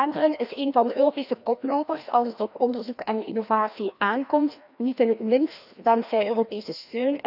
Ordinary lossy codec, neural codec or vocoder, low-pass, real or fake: none; codec, 16 kHz, 1 kbps, FreqCodec, larger model; 5.4 kHz; fake